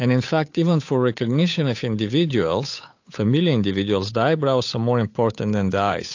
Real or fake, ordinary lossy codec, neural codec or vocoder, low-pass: fake; AAC, 48 kbps; codec, 16 kHz, 8 kbps, FunCodec, trained on Chinese and English, 25 frames a second; 7.2 kHz